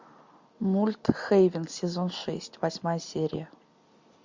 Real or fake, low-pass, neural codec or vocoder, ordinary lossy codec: real; 7.2 kHz; none; MP3, 64 kbps